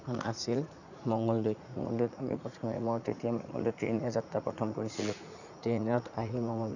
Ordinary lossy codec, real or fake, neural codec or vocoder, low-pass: none; fake; vocoder, 44.1 kHz, 80 mel bands, Vocos; 7.2 kHz